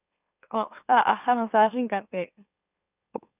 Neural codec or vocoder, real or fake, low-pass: autoencoder, 44.1 kHz, a latent of 192 numbers a frame, MeloTTS; fake; 3.6 kHz